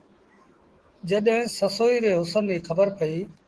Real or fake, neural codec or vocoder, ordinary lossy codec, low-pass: fake; autoencoder, 48 kHz, 128 numbers a frame, DAC-VAE, trained on Japanese speech; Opus, 16 kbps; 10.8 kHz